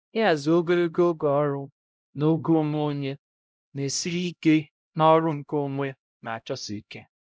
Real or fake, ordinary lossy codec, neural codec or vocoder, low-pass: fake; none; codec, 16 kHz, 0.5 kbps, X-Codec, HuBERT features, trained on LibriSpeech; none